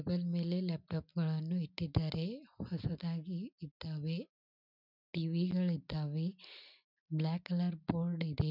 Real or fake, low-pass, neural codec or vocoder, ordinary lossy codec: real; 5.4 kHz; none; none